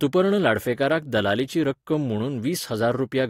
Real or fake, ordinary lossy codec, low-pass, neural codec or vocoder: real; AAC, 48 kbps; 19.8 kHz; none